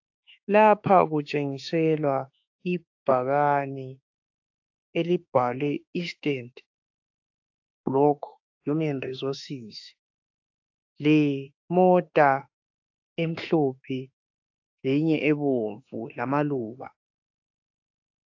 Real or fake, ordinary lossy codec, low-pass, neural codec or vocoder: fake; AAC, 48 kbps; 7.2 kHz; autoencoder, 48 kHz, 32 numbers a frame, DAC-VAE, trained on Japanese speech